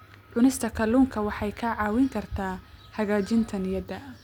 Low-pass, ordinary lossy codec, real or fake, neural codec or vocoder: 19.8 kHz; none; real; none